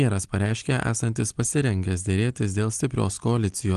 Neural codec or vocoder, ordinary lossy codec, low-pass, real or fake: none; Opus, 24 kbps; 10.8 kHz; real